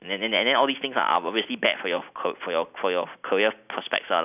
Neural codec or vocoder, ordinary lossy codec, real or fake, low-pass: none; none; real; 3.6 kHz